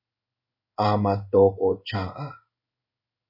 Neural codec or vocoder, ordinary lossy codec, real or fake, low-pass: codec, 16 kHz in and 24 kHz out, 1 kbps, XY-Tokenizer; MP3, 24 kbps; fake; 5.4 kHz